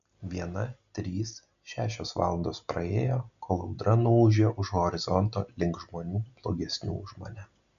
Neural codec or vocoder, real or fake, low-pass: none; real; 7.2 kHz